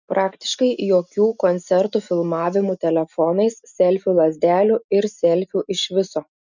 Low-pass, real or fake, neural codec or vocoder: 7.2 kHz; real; none